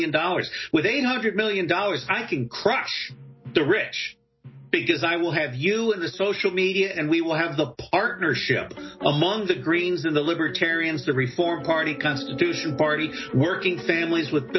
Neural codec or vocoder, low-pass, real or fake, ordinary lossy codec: none; 7.2 kHz; real; MP3, 24 kbps